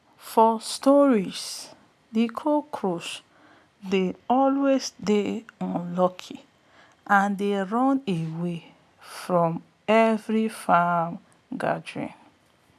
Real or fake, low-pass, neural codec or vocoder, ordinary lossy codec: real; 14.4 kHz; none; none